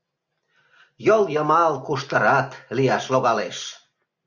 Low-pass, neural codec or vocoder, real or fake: 7.2 kHz; none; real